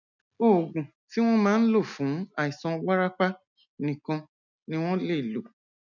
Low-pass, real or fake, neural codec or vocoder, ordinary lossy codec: 7.2 kHz; real; none; none